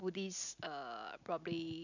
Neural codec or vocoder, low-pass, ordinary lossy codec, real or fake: none; 7.2 kHz; none; real